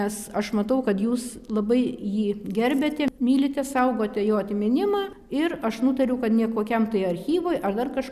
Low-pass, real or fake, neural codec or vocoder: 14.4 kHz; real; none